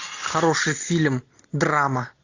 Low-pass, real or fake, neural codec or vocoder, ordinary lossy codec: 7.2 kHz; real; none; AAC, 48 kbps